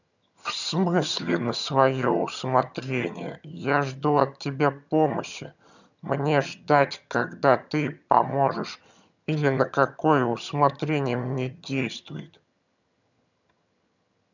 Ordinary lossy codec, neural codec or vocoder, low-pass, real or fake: none; vocoder, 22.05 kHz, 80 mel bands, HiFi-GAN; 7.2 kHz; fake